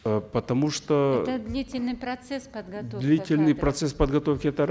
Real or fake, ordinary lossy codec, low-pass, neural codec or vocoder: real; none; none; none